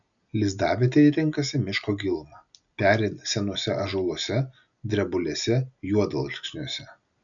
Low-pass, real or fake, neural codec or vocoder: 7.2 kHz; real; none